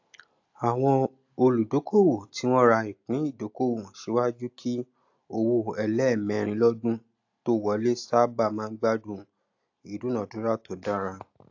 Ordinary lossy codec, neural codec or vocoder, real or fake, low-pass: AAC, 48 kbps; none; real; 7.2 kHz